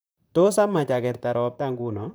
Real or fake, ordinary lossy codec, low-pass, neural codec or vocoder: fake; none; none; vocoder, 44.1 kHz, 128 mel bands, Pupu-Vocoder